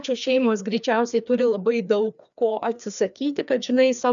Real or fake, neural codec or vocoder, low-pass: fake; codec, 16 kHz, 2 kbps, FreqCodec, larger model; 7.2 kHz